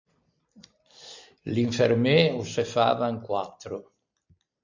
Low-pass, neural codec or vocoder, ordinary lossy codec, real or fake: 7.2 kHz; none; MP3, 64 kbps; real